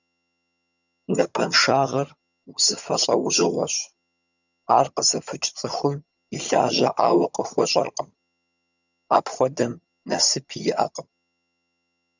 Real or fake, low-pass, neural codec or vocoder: fake; 7.2 kHz; vocoder, 22.05 kHz, 80 mel bands, HiFi-GAN